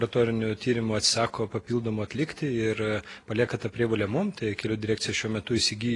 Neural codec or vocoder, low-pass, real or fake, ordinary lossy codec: none; 10.8 kHz; real; AAC, 32 kbps